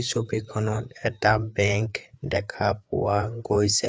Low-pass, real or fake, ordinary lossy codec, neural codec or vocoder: none; fake; none; codec, 16 kHz, 4 kbps, FreqCodec, larger model